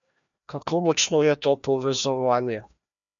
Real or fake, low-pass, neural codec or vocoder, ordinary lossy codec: fake; 7.2 kHz; codec, 16 kHz, 1 kbps, FreqCodec, larger model; none